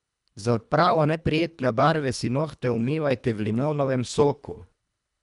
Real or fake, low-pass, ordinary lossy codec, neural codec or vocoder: fake; 10.8 kHz; none; codec, 24 kHz, 1.5 kbps, HILCodec